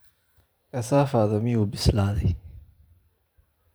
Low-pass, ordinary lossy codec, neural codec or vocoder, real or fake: none; none; vocoder, 44.1 kHz, 128 mel bands every 512 samples, BigVGAN v2; fake